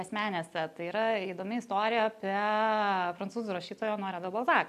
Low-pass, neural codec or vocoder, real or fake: 14.4 kHz; none; real